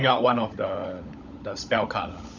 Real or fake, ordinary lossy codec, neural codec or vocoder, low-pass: fake; none; codec, 16 kHz, 16 kbps, FunCodec, trained on LibriTTS, 50 frames a second; 7.2 kHz